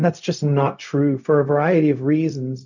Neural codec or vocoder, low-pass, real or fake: codec, 16 kHz, 0.4 kbps, LongCat-Audio-Codec; 7.2 kHz; fake